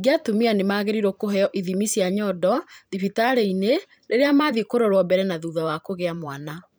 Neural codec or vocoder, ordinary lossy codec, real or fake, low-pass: vocoder, 44.1 kHz, 128 mel bands every 512 samples, BigVGAN v2; none; fake; none